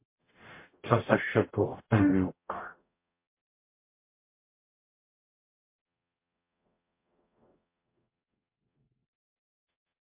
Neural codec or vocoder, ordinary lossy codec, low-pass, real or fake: codec, 44.1 kHz, 0.9 kbps, DAC; MP3, 24 kbps; 3.6 kHz; fake